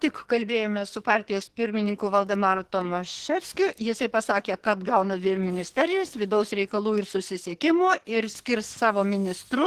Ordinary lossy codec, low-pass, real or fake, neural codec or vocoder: Opus, 16 kbps; 14.4 kHz; fake; codec, 44.1 kHz, 2.6 kbps, SNAC